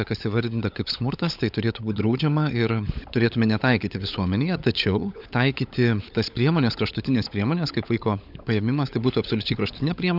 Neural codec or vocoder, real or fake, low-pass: codec, 16 kHz, 16 kbps, FunCodec, trained on LibriTTS, 50 frames a second; fake; 5.4 kHz